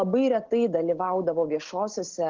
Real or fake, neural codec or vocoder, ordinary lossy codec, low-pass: real; none; Opus, 16 kbps; 7.2 kHz